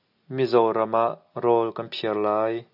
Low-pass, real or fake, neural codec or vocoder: 5.4 kHz; real; none